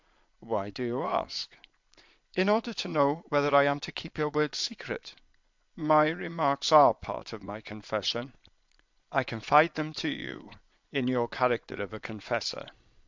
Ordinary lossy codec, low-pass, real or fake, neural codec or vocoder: MP3, 64 kbps; 7.2 kHz; fake; vocoder, 22.05 kHz, 80 mel bands, Vocos